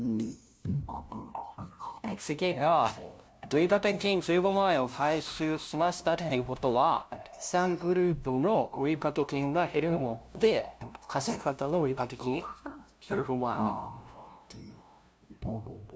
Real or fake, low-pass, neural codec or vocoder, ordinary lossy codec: fake; none; codec, 16 kHz, 0.5 kbps, FunCodec, trained on LibriTTS, 25 frames a second; none